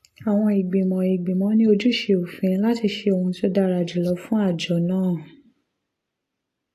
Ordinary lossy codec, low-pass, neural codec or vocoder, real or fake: AAC, 48 kbps; 14.4 kHz; none; real